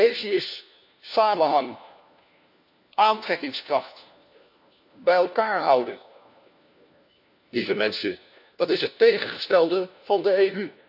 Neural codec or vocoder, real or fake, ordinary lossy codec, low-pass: codec, 16 kHz, 1 kbps, FunCodec, trained on LibriTTS, 50 frames a second; fake; AAC, 48 kbps; 5.4 kHz